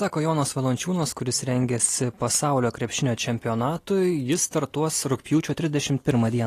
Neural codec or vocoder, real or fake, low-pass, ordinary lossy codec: none; real; 14.4 kHz; AAC, 48 kbps